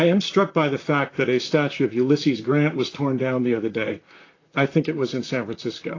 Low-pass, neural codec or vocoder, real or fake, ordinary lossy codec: 7.2 kHz; vocoder, 44.1 kHz, 128 mel bands, Pupu-Vocoder; fake; AAC, 32 kbps